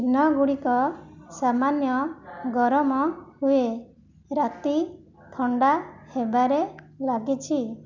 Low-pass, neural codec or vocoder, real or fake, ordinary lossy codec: 7.2 kHz; none; real; none